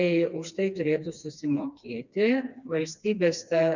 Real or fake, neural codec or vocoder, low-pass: fake; codec, 16 kHz, 2 kbps, FreqCodec, smaller model; 7.2 kHz